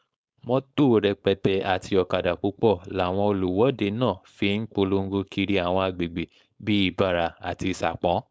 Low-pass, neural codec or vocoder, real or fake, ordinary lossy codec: none; codec, 16 kHz, 4.8 kbps, FACodec; fake; none